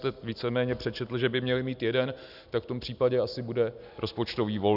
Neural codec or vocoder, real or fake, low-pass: none; real; 5.4 kHz